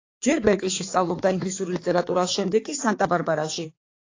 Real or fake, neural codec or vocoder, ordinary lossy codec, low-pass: fake; codec, 16 kHz, 4 kbps, X-Codec, HuBERT features, trained on balanced general audio; AAC, 32 kbps; 7.2 kHz